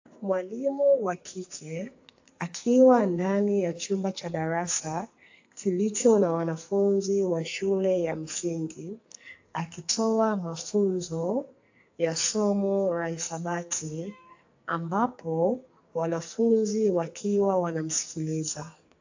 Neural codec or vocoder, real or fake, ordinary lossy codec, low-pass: codec, 44.1 kHz, 2.6 kbps, SNAC; fake; AAC, 48 kbps; 7.2 kHz